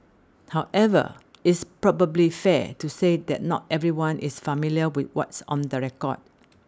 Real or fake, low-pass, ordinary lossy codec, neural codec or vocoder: real; none; none; none